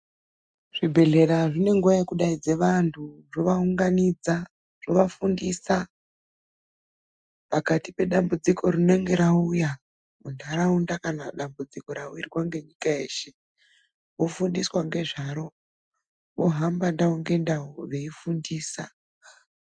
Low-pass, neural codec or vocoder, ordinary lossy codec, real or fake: 9.9 kHz; none; MP3, 96 kbps; real